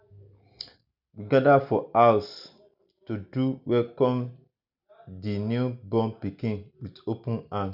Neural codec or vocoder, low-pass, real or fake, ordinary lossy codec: none; 5.4 kHz; real; none